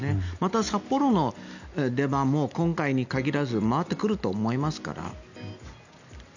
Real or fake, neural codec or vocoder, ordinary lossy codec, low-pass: real; none; none; 7.2 kHz